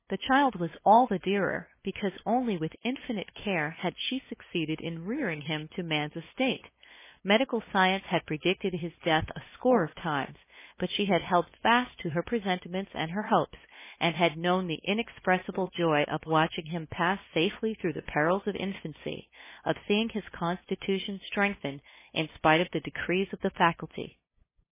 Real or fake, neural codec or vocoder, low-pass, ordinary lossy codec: fake; codec, 16 kHz, 8 kbps, FunCodec, trained on LibriTTS, 25 frames a second; 3.6 kHz; MP3, 16 kbps